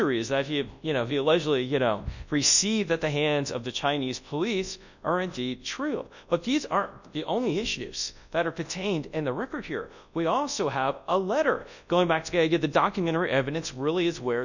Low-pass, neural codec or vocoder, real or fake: 7.2 kHz; codec, 24 kHz, 0.9 kbps, WavTokenizer, large speech release; fake